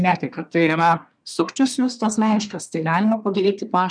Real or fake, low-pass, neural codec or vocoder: fake; 9.9 kHz; codec, 24 kHz, 1 kbps, SNAC